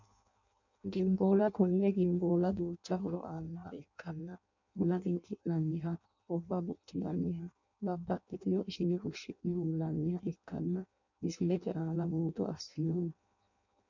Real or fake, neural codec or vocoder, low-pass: fake; codec, 16 kHz in and 24 kHz out, 0.6 kbps, FireRedTTS-2 codec; 7.2 kHz